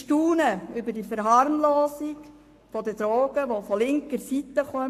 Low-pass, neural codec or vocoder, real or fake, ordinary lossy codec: 14.4 kHz; codec, 44.1 kHz, 7.8 kbps, Pupu-Codec; fake; AAC, 64 kbps